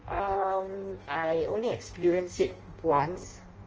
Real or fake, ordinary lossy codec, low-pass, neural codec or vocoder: fake; Opus, 24 kbps; 7.2 kHz; codec, 16 kHz in and 24 kHz out, 0.6 kbps, FireRedTTS-2 codec